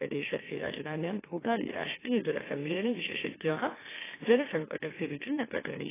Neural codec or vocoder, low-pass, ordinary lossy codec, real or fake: autoencoder, 44.1 kHz, a latent of 192 numbers a frame, MeloTTS; 3.6 kHz; AAC, 16 kbps; fake